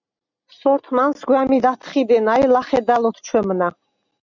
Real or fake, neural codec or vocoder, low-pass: real; none; 7.2 kHz